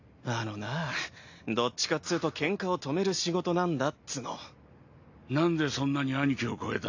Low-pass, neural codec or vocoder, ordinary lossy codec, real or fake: 7.2 kHz; none; AAC, 48 kbps; real